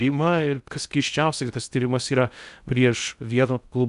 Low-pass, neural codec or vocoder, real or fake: 10.8 kHz; codec, 16 kHz in and 24 kHz out, 0.6 kbps, FocalCodec, streaming, 2048 codes; fake